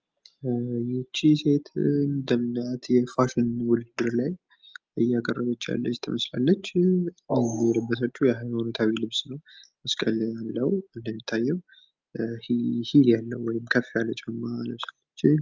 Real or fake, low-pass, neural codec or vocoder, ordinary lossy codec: real; 7.2 kHz; none; Opus, 24 kbps